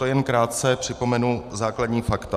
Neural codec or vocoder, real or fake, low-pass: vocoder, 44.1 kHz, 128 mel bands every 512 samples, BigVGAN v2; fake; 14.4 kHz